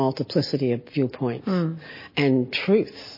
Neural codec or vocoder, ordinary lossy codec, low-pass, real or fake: none; MP3, 24 kbps; 5.4 kHz; real